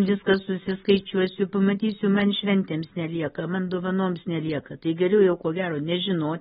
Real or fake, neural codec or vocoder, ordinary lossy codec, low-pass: real; none; AAC, 16 kbps; 19.8 kHz